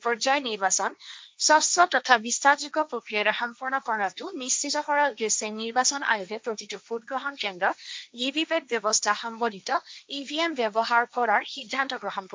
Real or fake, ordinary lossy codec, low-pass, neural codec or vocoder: fake; none; none; codec, 16 kHz, 1.1 kbps, Voila-Tokenizer